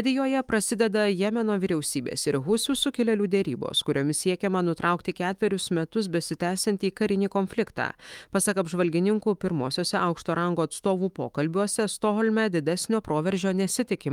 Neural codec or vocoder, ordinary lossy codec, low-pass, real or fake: autoencoder, 48 kHz, 128 numbers a frame, DAC-VAE, trained on Japanese speech; Opus, 32 kbps; 19.8 kHz; fake